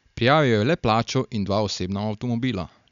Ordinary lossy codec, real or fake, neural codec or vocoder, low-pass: none; real; none; 7.2 kHz